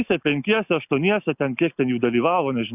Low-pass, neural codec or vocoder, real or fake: 3.6 kHz; none; real